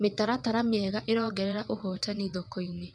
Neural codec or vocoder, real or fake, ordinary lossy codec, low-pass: vocoder, 22.05 kHz, 80 mel bands, WaveNeXt; fake; none; none